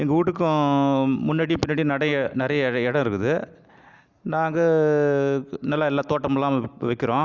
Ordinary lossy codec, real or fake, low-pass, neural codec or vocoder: Opus, 64 kbps; real; 7.2 kHz; none